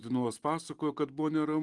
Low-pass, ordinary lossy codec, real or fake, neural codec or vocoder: 10.8 kHz; Opus, 24 kbps; real; none